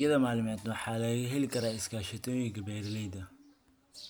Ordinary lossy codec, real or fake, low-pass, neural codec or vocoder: none; real; none; none